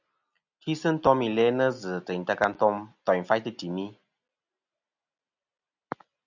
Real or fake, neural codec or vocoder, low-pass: real; none; 7.2 kHz